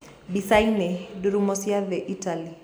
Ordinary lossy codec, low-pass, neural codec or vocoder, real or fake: none; none; none; real